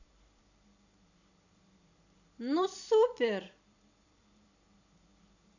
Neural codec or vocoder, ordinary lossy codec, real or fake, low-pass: vocoder, 44.1 kHz, 128 mel bands every 256 samples, BigVGAN v2; none; fake; 7.2 kHz